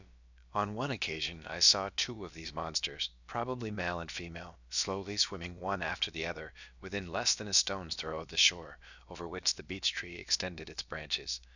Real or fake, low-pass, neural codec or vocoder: fake; 7.2 kHz; codec, 16 kHz, about 1 kbps, DyCAST, with the encoder's durations